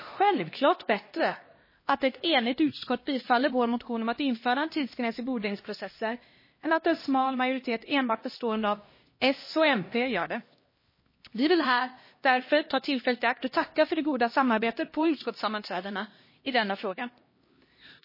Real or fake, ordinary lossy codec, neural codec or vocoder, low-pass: fake; MP3, 24 kbps; codec, 16 kHz, 1 kbps, X-Codec, HuBERT features, trained on LibriSpeech; 5.4 kHz